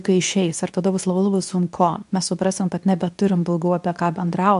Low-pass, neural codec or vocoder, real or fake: 10.8 kHz; codec, 24 kHz, 0.9 kbps, WavTokenizer, medium speech release version 2; fake